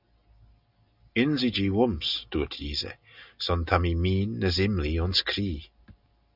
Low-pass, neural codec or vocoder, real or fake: 5.4 kHz; none; real